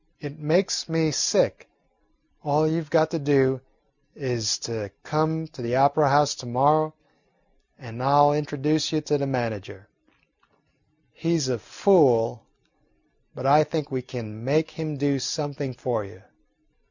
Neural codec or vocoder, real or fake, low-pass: none; real; 7.2 kHz